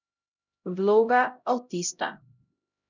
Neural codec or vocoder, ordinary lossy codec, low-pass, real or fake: codec, 16 kHz, 0.5 kbps, X-Codec, HuBERT features, trained on LibriSpeech; none; 7.2 kHz; fake